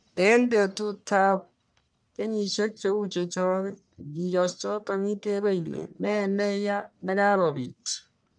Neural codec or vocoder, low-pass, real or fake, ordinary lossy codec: codec, 44.1 kHz, 1.7 kbps, Pupu-Codec; 9.9 kHz; fake; none